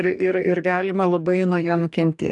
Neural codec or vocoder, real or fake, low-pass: codec, 44.1 kHz, 2.6 kbps, DAC; fake; 10.8 kHz